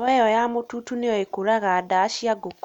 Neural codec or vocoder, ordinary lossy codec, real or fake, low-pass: none; none; real; 19.8 kHz